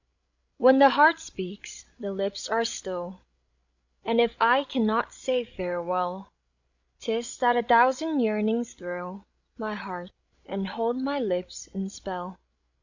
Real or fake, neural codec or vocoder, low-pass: fake; codec, 16 kHz, 16 kbps, FreqCodec, larger model; 7.2 kHz